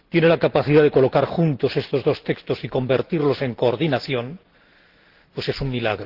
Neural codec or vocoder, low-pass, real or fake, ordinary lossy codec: none; 5.4 kHz; real; Opus, 16 kbps